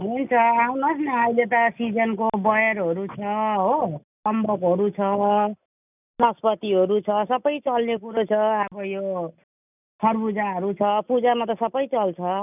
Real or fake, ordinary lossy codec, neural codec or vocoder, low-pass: real; none; none; 3.6 kHz